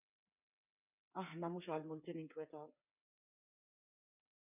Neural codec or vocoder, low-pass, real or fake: codec, 16 kHz, 8 kbps, FreqCodec, smaller model; 3.6 kHz; fake